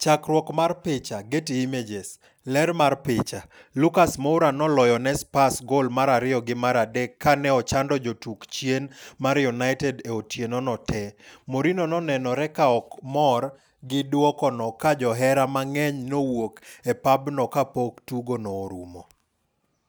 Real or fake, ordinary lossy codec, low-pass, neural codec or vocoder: real; none; none; none